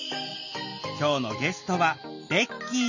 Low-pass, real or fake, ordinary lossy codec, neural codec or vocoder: 7.2 kHz; real; none; none